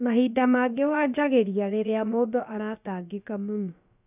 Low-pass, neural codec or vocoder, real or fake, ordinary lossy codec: 3.6 kHz; codec, 16 kHz, about 1 kbps, DyCAST, with the encoder's durations; fake; none